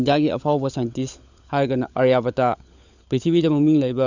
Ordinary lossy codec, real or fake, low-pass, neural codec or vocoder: none; fake; 7.2 kHz; codec, 16 kHz, 16 kbps, FunCodec, trained on LibriTTS, 50 frames a second